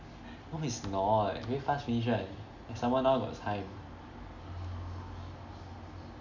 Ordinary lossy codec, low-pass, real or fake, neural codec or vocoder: none; 7.2 kHz; real; none